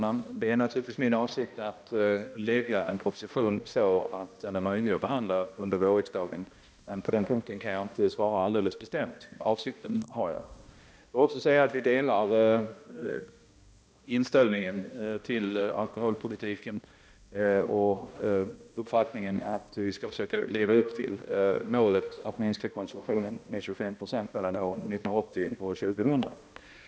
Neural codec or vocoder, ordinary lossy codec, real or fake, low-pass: codec, 16 kHz, 1 kbps, X-Codec, HuBERT features, trained on balanced general audio; none; fake; none